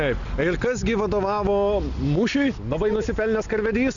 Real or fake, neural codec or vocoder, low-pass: real; none; 7.2 kHz